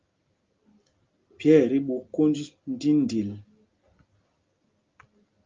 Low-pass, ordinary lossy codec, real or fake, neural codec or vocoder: 7.2 kHz; Opus, 24 kbps; real; none